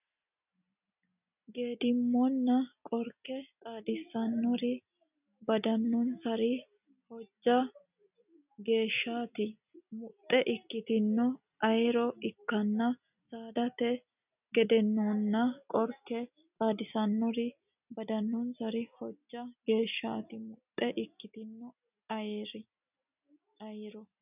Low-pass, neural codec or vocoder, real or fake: 3.6 kHz; none; real